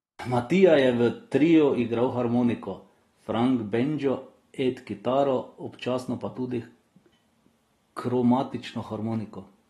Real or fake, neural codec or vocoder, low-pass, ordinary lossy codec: real; none; 19.8 kHz; AAC, 32 kbps